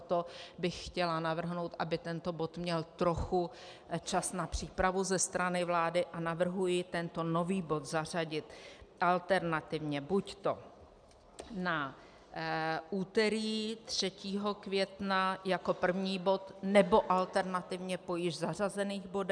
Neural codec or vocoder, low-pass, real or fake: none; 9.9 kHz; real